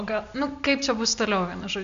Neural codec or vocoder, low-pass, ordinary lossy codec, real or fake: none; 7.2 kHz; MP3, 64 kbps; real